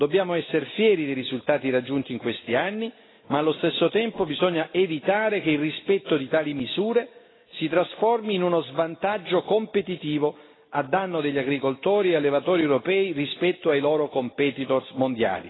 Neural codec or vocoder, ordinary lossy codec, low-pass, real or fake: none; AAC, 16 kbps; 7.2 kHz; real